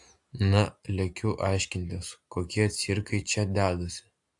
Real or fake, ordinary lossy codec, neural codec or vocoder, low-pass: real; AAC, 64 kbps; none; 10.8 kHz